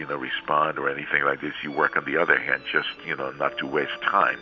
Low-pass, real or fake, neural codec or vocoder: 7.2 kHz; real; none